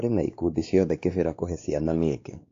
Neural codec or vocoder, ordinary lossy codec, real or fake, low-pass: codec, 16 kHz, 2 kbps, X-Codec, WavLM features, trained on Multilingual LibriSpeech; AAC, 48 kbps; fake; 7.2 kHz